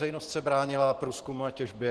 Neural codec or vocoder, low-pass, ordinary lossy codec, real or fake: vocoder, 44.1 kHz, 128 mel bands every 512 samples, BigVGAN v2; 10.8 kHz; Opus, 16 kbps; fake